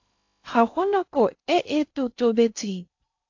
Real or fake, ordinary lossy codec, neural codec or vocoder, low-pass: fake; AAC, 48 kbps; codec, 16 kHz in and 24 kHz out, 0.6 kbps, FocalCodec, streaming, 2048 codes; 7.2 kHz